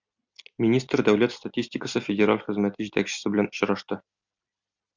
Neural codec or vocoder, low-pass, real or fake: none; 7.2 kHz; real